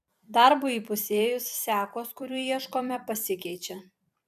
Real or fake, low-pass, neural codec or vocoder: fake; 14.4 kHz; vocoder, 44.1 kHz, 128 mel bands every 256 samples, BigVGAN v2